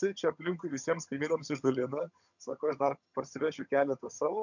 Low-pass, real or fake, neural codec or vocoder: 7.2 kHz; fake; vocoder, 22.05 kHz, 80 mel bands, HiFi-GAN